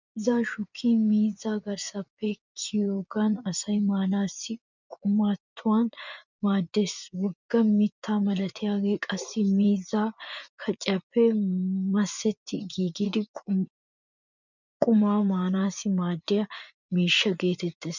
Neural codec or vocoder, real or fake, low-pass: vocoder, 24 kHz, 100 mel bands, Vocos; fake; 7.2 kHz